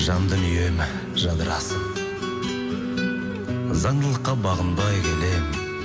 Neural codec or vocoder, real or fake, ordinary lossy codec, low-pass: none; real; none; none